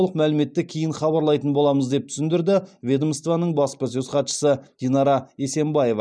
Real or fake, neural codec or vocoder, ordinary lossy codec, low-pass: real; none; none; none